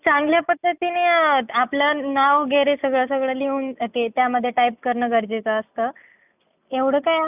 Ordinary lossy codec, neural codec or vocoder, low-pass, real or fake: none; none; 3.6 kHz; real